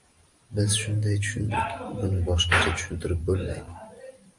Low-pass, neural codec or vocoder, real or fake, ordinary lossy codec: 10.8 kHz; vocoder, 44.1 kHz, 128 mel bands every 512 samples, BigVGAN v2; fake; AAC, 64 kbps